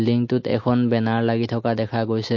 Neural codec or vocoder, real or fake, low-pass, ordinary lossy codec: none; real; 7.2 kHz; MP3, 32 kbps